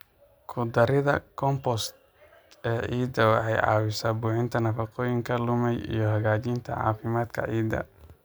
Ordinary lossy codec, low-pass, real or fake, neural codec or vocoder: none; none; real; none